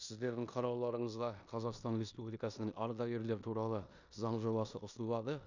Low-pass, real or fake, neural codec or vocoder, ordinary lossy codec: 7.2 kHz; fake; codec, 16 kHz in and 24 kHz out, 0.9 kbps, LongCat-Audio-Codec, four codebook decoder; none